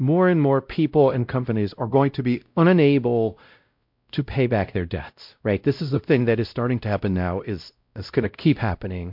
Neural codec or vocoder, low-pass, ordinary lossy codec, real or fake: codec, 16 kHz, 0.5 kbps, X-Codec, WavLM features, trained on Multilingual LibriSpeech; 5.4 kHz; MP3, 48 kbps; fake